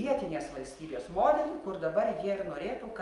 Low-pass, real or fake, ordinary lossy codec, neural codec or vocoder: 10.8 kHz; real; Opus, 32 kbps; none